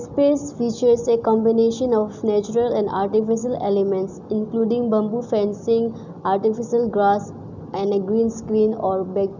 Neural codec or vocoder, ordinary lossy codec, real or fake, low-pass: none; none; real; 7.2 kHz